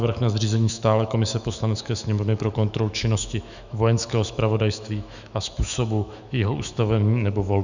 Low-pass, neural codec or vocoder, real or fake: 7.2 kHz; autoencoder, 48 kHz, 128 numbers a frame, DAC-VAE, trained on Japanese speech; fake